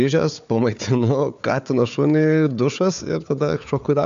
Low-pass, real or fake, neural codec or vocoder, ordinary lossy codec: 7.2 kHz; fake; codec, 16 kHz, 4 kbps, FunCodec, trained on Chinese and English, 50 frames a second; MP3, 64 kbps